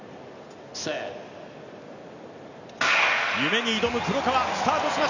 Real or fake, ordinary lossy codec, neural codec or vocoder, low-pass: real; none; none; 7.2 kHz